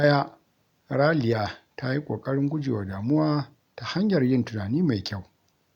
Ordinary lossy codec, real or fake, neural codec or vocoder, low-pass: none; real; none; 19.8 kHz